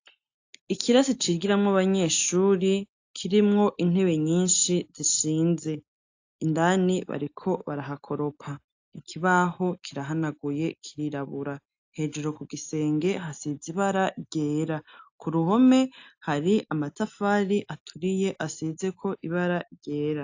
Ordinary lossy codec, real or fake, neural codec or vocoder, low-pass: AAC, 48 kbps; real; none; 7.2 kHz